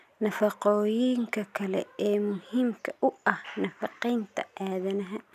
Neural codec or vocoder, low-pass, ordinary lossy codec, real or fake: none; 14.4 kHz; none; real